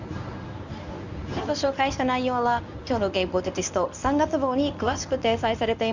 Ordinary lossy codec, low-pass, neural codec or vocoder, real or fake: none; 7.2 kHz; codec, 24 kHz, 0.9 kbps, WavTokenizer, medium speech release version 1; fake